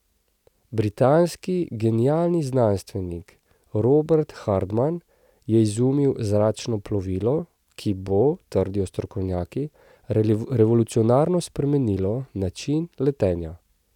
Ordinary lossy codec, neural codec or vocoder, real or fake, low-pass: none; none; real; 19.8 kHz